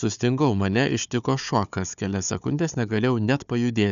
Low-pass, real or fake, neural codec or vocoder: 7.2 kHz; fake; codec, 16 kHz, 4 kbps, FunCodec, trained on Chinese and English, 50 frames a second